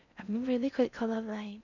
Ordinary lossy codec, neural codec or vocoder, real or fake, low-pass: none; codec, 16 kHz in and 24 kHz out, 0.6 kbps, FocalCodec, streaming, 4096 codes; fake; 7.2 kHz